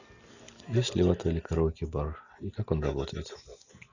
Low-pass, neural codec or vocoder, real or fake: 7.2 kHz; none; real